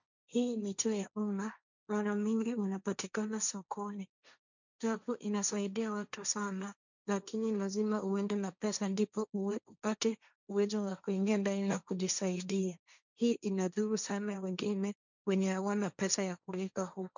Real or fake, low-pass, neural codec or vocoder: fake; 7.2 kHz; codec, 16 kHz, 1.1 kbps, Voila-Tokenizer